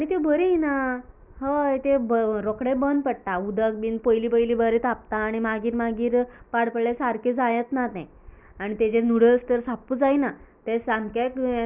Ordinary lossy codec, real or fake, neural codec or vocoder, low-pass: none; real; none; 3.6 kHz